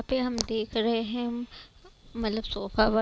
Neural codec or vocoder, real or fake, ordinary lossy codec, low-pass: none; real; none; none